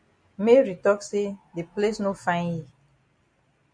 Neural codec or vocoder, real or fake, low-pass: none; real; 9.9 kHz